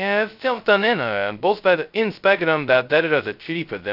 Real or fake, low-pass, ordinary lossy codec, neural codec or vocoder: fake; 5.4 kHz; AAC, 48 kbps; codec, 16 kHz, 0.2 kbps, FocalCodec